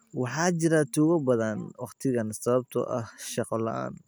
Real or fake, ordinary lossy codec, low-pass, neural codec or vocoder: real; none; none; none